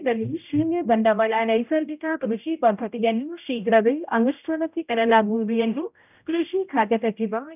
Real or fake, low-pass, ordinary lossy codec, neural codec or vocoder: fake; 3.6 kHz; none; codec, 16 kHz, 0.5 kbps, X-Codec, HuBERT features, trained on general audio